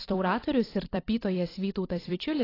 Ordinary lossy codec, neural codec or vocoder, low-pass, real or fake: AAC, 24 kbps; vocoder, 44.1 kHz, 128 mel bands every 256 samples, BigVGAN v2; 5.4 kHz; fake